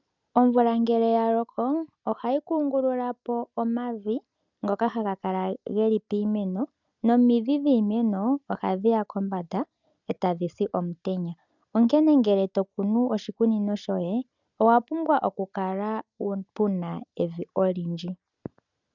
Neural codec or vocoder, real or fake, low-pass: none; real; 7.2 kHz